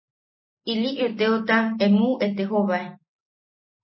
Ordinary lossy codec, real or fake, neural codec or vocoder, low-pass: MP3, 24 kbps; real; none; 7.2 kHz